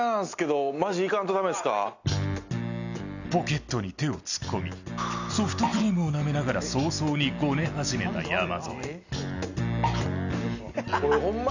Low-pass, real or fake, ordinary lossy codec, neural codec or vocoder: 7.2 kHz; real; none; none